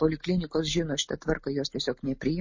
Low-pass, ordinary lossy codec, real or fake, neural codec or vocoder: 7.2 kHz; MP3, 32 kbps; real; none